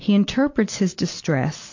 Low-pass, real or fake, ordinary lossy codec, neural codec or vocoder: 7.2 kHz; real; AAC, 48 kbps; none